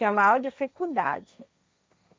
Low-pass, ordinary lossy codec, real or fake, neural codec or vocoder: none; none; fake; codec, 16 kHz, 1.1 kbps, Voila-Tokenizer